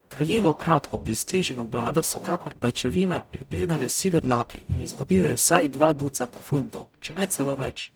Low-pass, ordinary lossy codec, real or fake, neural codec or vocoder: none; none; fake; codec, 44.1 kHz, 0.9 kbps, DAC